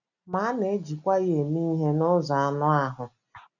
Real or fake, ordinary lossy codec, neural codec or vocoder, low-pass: real; none; none; 7.2 kHz